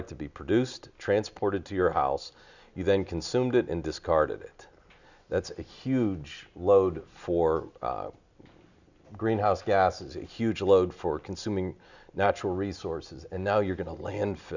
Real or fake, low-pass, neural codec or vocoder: real; 7.2 kHz; none